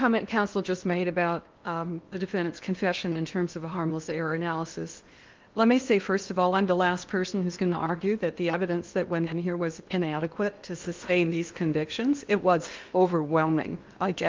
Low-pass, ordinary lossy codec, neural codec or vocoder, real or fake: 7.2 kHz; Opus, 32 kbps; codec, 16 kHz in and 24 kHz out, 0.8 kbps, FocalCodec, streaming, 65536 codes; fake